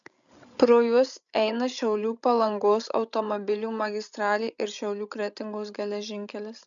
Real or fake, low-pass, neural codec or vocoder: fake; 7.2 kHz; codec, 16 kHz, 16 kbps, FreqCodec, larger model